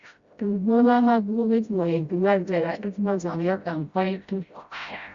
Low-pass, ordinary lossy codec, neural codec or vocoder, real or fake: 7.2 kHz; Opus, 64 kbps; codec, 16 kHz, 0.5 kbps, FreqCodec, smaller model; fake